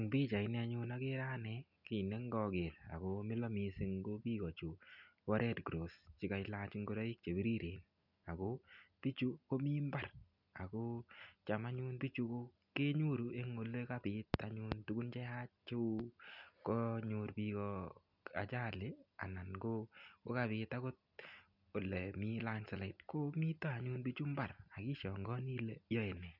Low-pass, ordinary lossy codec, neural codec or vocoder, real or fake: 5.4 kHz; none; none; real